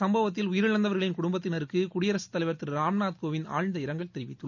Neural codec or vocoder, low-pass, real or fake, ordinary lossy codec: none; 7.2 kHz; real; none